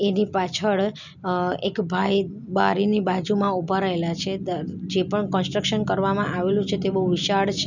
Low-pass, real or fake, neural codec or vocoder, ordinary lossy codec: 7.2 kHz; real; none; none